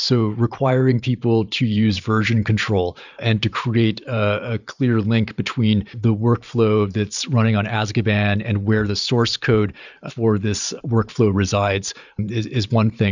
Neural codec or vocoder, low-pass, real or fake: vocoder, 44.1 kHz, 128 mel bands every 512 samples, BigVGAN v2; 7.2 kHz; fake